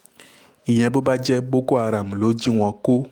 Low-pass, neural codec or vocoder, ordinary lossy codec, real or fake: 19.8 kHz; autoencoder, 48 kHz, 128 numbers a frame, DAC-VAE, trained on Japanese speech; Opus, 32 kbps; fake